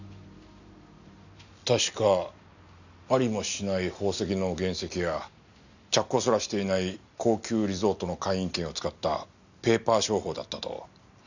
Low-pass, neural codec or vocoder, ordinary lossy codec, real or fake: 7.2 kHz; none; none; real